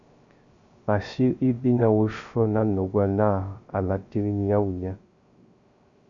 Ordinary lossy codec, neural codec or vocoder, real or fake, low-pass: Opus, 64 kbps; codec, 16 kHz, 0.3 kbps, FocalCodec; fake; 7.2 kHz